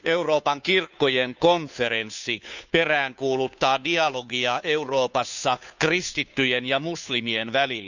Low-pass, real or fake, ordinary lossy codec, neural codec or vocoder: 7.2 kHz; fake; none; codec, 16 kHz, 2 kbps, FunCodec, trained on Chinese and English, 25 frames a second